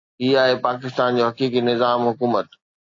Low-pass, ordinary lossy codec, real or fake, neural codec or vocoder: 7.2 kHz; AAC, 48 kbps; real; none